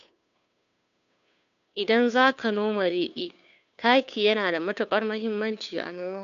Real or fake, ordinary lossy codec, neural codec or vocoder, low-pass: fake; none; codec, 16 kHz, 2 kbps, FunCodec, trained on Chinese and English, 25 frames a second; 7.2 kHz